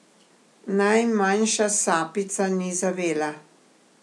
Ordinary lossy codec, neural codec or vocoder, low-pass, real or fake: none; none; none; real